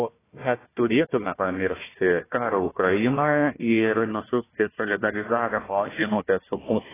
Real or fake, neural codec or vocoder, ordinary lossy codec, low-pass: fake; codec, 16 kHz, 1 kbps, FunCodec, trained on Chinese and English, 50 frames a second; AAC, 16 kbps; 3.6 kHz